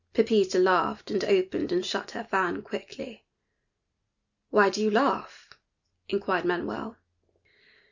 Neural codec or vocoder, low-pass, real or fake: none; 7.2 kHz; real